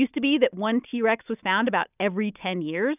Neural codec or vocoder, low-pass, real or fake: none; 3.6 kHz; real